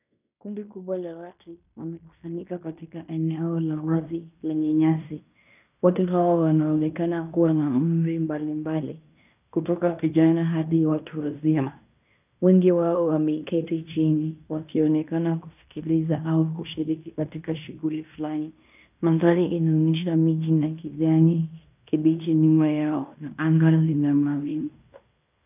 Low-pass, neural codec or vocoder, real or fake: 3.6 kHz; codec, 16 kHz in and 24 kHz out, 0.9 kbps, LongCat-Audio-Codec, fine tuned four codebook decoder; fake